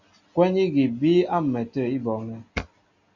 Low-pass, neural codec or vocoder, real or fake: 7.2 kHz; none; real